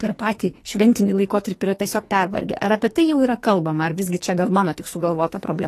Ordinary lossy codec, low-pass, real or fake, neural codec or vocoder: AAC, 48 kbps; 14.4 kHz; fake; codec, 44.1 kHz, 2.6 kbps, SNAC